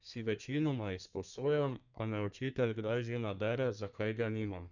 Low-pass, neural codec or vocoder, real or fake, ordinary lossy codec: 7.2 kHz; codec, 32 kHz, 1.9 kbps, SNAC; fake; none